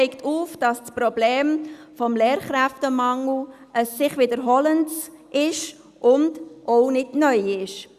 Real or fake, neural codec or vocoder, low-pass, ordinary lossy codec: real; none; 14.4 kHz; Opus, 64 kbps